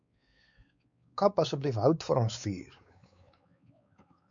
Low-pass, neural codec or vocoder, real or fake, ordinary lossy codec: 7.2 kHz; codec, 16 kHz, 4 kbps, X-Codec, WavLM features, trained on Multilingual LibriSpeech; fake; MP3, 64 kbps